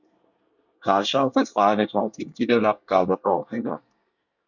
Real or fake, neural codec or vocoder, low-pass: fake; codec, 24 kHz, 1 kbps, SNAC; 7.2 kHz